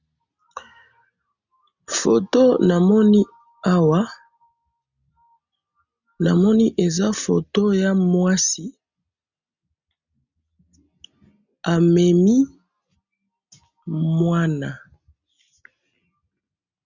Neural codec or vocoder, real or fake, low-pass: none; real; 7.2 kHz